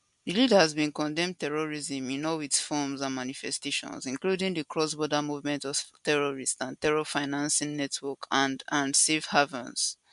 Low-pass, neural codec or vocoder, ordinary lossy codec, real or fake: 10.8 kHz; none; MP3, 64 kbps; real